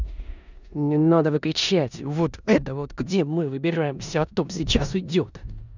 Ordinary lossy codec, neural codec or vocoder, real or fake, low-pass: none; codec, 16 kHz in and 24 kHz out, 0.9 kbps, LongCat-Audio-Codec, four codebook decoder; fake; 7.2 kHz